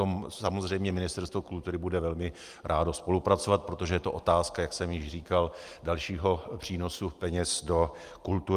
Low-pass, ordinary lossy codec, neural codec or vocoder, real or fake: 14.4 kHz; Opus, 32 kbps; none; real